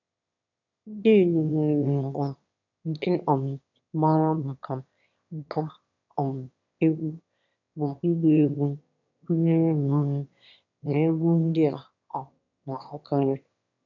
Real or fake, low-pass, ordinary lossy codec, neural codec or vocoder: fake; 7.2 kHz; none; autoencoder, 22.05 kHz, a latent of 192 numbers a frame, VITS, trained on one speaker